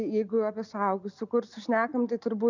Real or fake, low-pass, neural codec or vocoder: real; 7.2 kHz; none